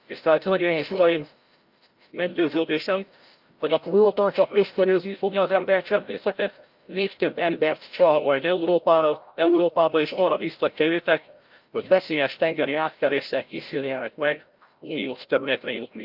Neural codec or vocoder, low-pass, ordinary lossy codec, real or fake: codec, 16 kHz, 0.5 kbps, FreqCodec, larger model; 5.4 kHz; Opus, 32 kbps; fake